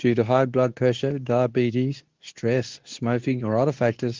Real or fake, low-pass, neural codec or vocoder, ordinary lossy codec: fake; 7.2 kHz; codec, 24 kHz, 0.9 kbps, WavTokenizer, medium speech release version 2; Opus, 24 kbps